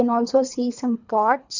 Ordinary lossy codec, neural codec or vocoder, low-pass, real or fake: none; codec, 24 kHz, 3 kbps, HILCodec; 7.2 kHz; fake